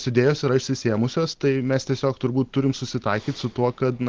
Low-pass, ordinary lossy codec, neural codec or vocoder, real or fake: 7.2 kHz; Opus, 16 kbps; none; real